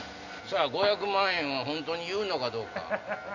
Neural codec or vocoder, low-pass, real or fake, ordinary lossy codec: none; 7.2 kHz; real; none